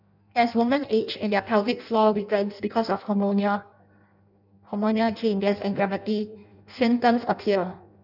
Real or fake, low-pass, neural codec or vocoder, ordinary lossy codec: fake; 5.4 kHz; codec, 16 kHz in and 24 kHz out, 0.6 kbps, FireRedTTS-2 codec; none